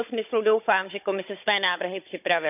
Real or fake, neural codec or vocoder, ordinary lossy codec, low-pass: fake; codec, 16 kHz, 16 kbps, FunCodec, trained on Chinese and English, 50 frames a second; none; 3.6 kHz